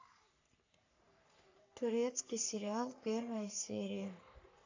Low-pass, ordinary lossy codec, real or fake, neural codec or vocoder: 7.2 kHz; AAC, 48 kbps; fake; codec, 44.1 kHz, 3.4 kbps, Pupu-Codec